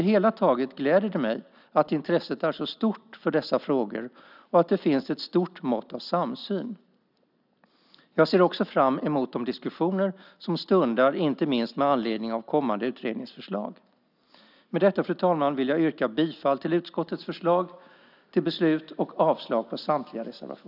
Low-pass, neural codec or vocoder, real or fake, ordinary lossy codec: 5.4 kHz; none; real; none